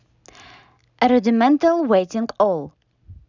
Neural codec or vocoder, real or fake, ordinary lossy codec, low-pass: none; real; none; 7.2 kHz